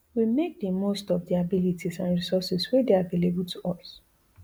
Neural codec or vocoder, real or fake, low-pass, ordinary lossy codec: none; real; none; none